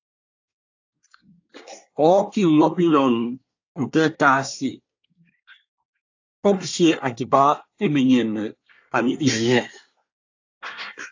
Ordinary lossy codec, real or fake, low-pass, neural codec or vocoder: AAC, 48 kbps; fake; 7.2 kHz; codec, 24 kHz, 1 kbps, SNAC